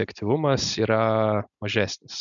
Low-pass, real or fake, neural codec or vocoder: 7.2 kHz; real; none